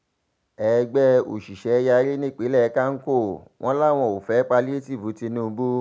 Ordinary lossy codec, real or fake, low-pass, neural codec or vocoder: none; real; none; none